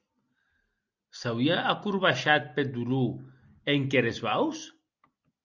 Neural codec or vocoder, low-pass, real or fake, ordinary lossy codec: none; 7.2 kHz; real; Opus, 64 kbps